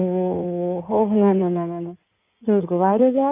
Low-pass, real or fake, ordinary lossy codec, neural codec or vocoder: 3.6 kHz; fake; none; codec, 16 kHz in and 24 kHz out, 2.2 kbps, FireRedTTS-2 codec